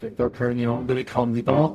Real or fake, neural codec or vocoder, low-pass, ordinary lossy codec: fake; codec, 44.1 kHz, 0.9 kbps, DAC; 14.4 kHz; none